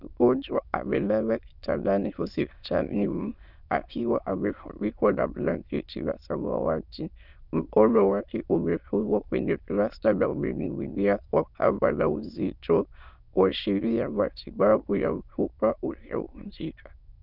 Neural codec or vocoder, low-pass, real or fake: autoencoder, 22.05 kHz, a latent of 192 numbers a frame, VITS, trained on many speakers; 5.4 kHz; fake